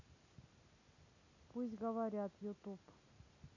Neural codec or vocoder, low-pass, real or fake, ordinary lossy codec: none; 7.2 kHz; real; none